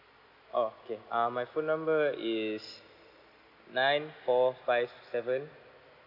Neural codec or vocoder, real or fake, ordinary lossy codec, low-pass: none; real; none; 5.4 kHz